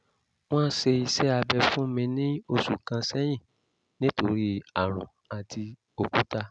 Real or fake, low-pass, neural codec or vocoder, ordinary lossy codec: real; 9.9 kHz; none; none